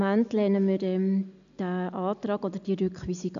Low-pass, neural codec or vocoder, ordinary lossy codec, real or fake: 7.2 kHz; none; none; real